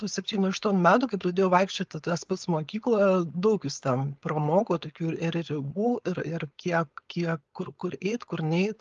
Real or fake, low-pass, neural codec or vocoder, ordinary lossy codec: fake; 7.2 kHz; codec, 16 kHz, 4.8 kbps, FACodec; Opus, 32 kbps